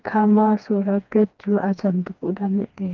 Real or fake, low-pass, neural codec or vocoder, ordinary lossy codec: fake; 7.2 kHz; codec, 16 kHz, 2 kbps, FreqCodec, smaller model; Opus, 24 kbps